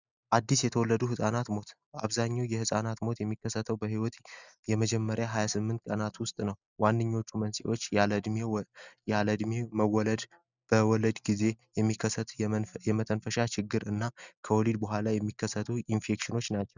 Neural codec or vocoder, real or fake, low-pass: none; real; 7.2 kHz